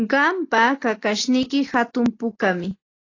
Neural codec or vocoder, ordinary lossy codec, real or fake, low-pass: none; AAC, 32 kbps; real; 7.2 kHz